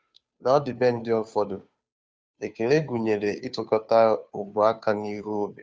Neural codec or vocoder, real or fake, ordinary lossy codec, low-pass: codec, 16 kHz, 2 kbps, FunCodec, trained on Chinese and English, 25 frames a second; fake; none; none